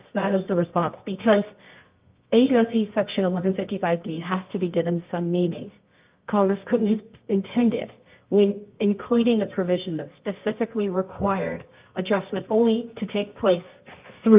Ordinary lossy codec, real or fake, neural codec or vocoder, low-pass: Opus, 24 kbps; fake; codec, 24 kHz, 0.9 kbps, WavTokenizer, medium music audio release; 3.6 kHz